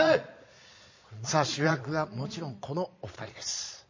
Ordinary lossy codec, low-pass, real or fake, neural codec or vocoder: MP3, 32 kbps; 7.2 kHz; fake; vocoder, 22.05 kHz, 80 mel bands, Vocos